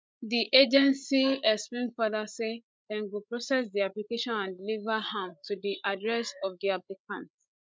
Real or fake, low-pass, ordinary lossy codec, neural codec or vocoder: fake; none; none; codec, 16 kHz, 16 kbps, FreqCodec, larger model